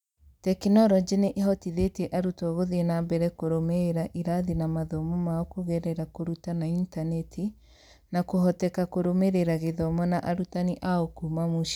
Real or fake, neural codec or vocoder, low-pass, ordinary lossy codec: real; none; 19.8 kHz; none